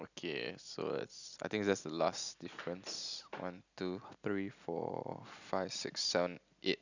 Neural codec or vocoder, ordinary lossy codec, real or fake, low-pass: none; none; real; 7.2 kHz